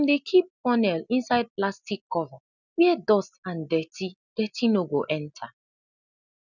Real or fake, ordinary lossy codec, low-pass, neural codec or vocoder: real; none; 7.2 kHz; none